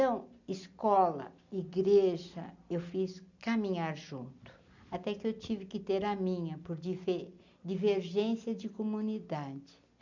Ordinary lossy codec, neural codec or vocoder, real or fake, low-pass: none; none; real; 7.2 kHz